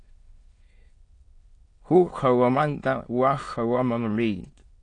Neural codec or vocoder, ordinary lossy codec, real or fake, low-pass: autoencoder, 22.05 kHz, a latent of 192 numbers a frame, VITS, trained on many speakers; AAC, 48 kbps; fake; 9.9 kHz